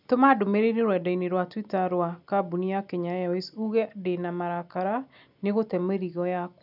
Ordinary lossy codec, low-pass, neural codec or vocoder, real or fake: none; 5.4 kHz; none; real